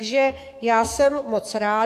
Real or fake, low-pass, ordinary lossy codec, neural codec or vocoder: fake; 14.4 kHz; AAC, 64 kbps; codec, 44.1 kHz, 7.8 kbps, DAC